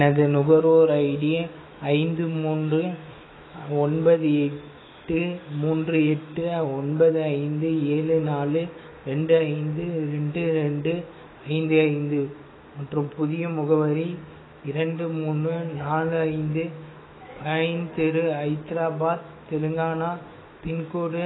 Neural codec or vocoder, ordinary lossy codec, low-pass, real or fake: autoencoder, 48 kHz, 128 numbers a frame, DAC-VAE, trained on Japanese speech; AAC, 16 kbps; 7.2 kHz; fake